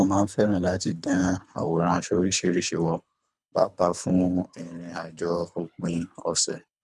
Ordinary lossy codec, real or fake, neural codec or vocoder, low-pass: none; fake; codec, 24 kHz, 3 kbps, HILCodec; none